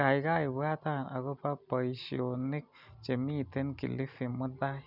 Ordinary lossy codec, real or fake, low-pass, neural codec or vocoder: none; real; 5.4 kHz; none